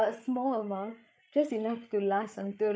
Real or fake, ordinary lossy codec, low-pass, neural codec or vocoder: fake; none; none; codec, 16 kHz, 8 kbps, FreqCodec, larger model